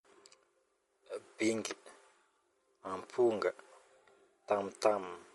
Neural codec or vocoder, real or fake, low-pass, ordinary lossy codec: none; real; 19.8 kHz; MP3, 48 kbps